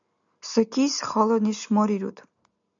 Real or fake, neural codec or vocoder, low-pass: real; none; 7.2 kHz